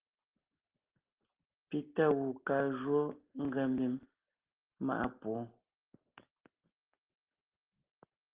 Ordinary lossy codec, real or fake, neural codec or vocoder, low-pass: Opus, 16 kbps; real; none; 3.6 kHz